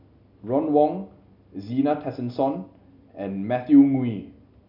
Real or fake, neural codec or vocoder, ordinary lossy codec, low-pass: real; none; none; 5.4 kHz